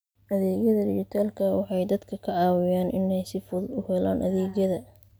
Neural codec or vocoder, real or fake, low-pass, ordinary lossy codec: none; real; none; none